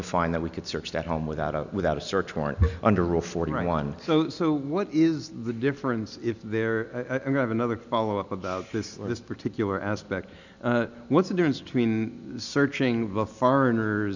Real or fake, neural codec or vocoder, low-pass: real; none; 7.2 kHz